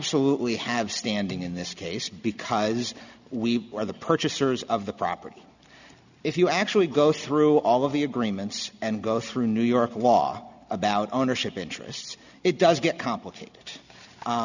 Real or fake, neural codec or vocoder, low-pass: real; none; 7.2 kHz